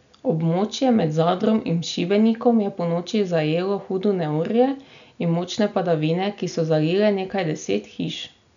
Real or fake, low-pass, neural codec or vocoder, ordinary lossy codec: real; 7.2 kHz; none; none